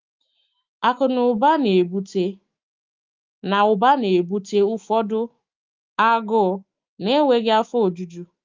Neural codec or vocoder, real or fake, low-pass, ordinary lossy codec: autoencoder, 48 kHz, 128 numbers a frame, DAC-VAE, trained on Japanese speech; fake; 7.2 kHz; Opus, 24 kbps